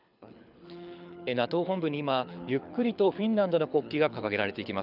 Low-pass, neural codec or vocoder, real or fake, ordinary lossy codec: 5.4 kHz; codec, 24 kHz, 6 kbps, HILCodec; fake; none